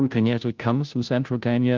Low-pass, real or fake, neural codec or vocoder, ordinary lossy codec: 7.2 kHz; fake; codec, 16 kHz, 0.5 kbps, FunCodec, trained on Chinese and English, 25 frames a second; Opus, 24 kbps